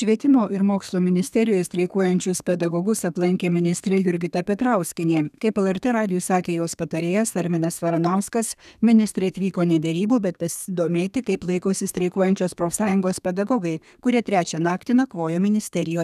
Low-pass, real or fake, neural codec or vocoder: 14.4 kHz; fake; codec, 32 kHz, 1.9 kbps, SNAC